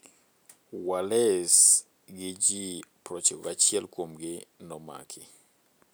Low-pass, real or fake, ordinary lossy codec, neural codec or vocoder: none; real; none; none